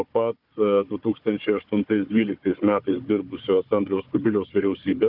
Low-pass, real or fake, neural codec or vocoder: 5.4 kHz; fake; codec, 16 kHz, 4 kbps, FunCodec, trained on Chinese and English, 50 frames a second